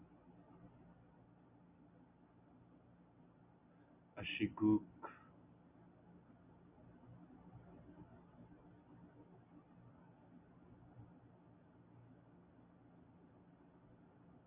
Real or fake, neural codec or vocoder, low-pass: real; none; 3.6 kHz